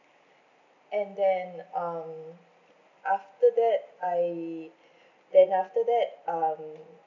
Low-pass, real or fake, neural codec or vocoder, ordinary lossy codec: 7.2 kHz; real; none; none